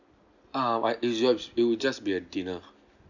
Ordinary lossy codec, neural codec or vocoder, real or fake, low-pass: none; none; real; 7.2 kHz